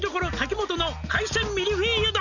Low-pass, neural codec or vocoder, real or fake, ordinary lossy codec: 7.2 kHz; none; real; none